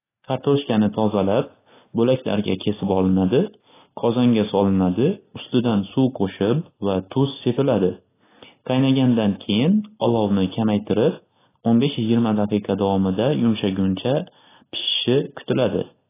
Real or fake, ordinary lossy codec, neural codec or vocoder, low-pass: real; AAC, 16 kbps; none; 3.6 kHz